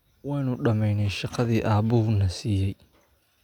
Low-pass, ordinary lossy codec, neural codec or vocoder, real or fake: 19.8 kHz; none; none; real